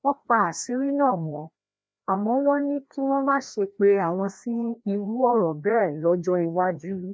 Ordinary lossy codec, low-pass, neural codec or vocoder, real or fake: none; none; codec, 16 kHz, 1 kbps, FreqCodec, larger model; fake